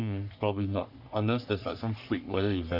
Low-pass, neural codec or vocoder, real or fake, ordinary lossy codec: 5.4 kHz; codec, 44.1 kHz, 3.4 kbps, Pupu-Codec; fake; none